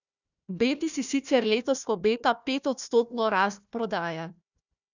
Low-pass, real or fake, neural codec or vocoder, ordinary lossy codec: 7.2 kHz; fake; codec, 16 kHz, 1 kbps, FunCodec, trained on Chinese and English, 50 frames a second; none